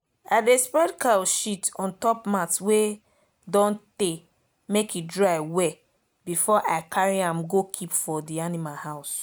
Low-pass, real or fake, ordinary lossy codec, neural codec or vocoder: none; real; none; none